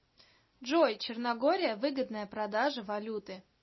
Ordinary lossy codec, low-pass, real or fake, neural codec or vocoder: MP3, 24 kbps; 7.2 kHz; real; none